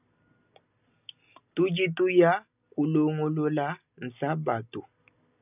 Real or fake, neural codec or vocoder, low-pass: real; none; 3.6 kHz